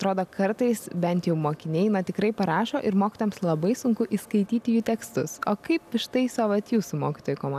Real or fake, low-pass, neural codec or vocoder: real; 14.4 kHz; none